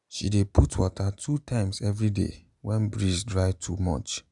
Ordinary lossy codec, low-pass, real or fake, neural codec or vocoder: none; 10.8 kHz; real; none